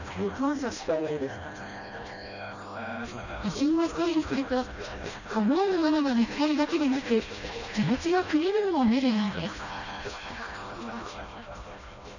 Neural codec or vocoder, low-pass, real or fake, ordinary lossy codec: codec, 16 kHz, 1 kbps, FreqCodec, smaller model; 7.2 kHz; fake; none